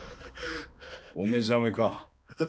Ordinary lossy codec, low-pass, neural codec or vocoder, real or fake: none; none; codec, 16 kHz, 2 kbps, X-Codec, HuBERT features, trained on balanced general audio; fake